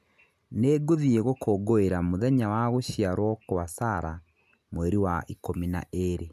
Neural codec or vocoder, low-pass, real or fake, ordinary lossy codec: none; 14.4 kHz; real; none